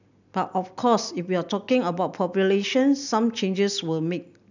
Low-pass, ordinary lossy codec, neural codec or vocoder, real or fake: 7.2 kHz; none; none; real